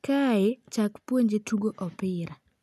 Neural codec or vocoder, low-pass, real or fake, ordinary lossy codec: none; 14.4 kHz; real; none